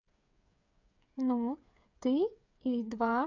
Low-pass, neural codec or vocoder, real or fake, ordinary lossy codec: 7.2 kHz; codec, 16 kHz, 8 kbps, FreqCodec, smaller model; fake; none